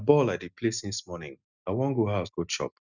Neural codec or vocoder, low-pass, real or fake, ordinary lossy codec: none; 7.2 kHz; real; none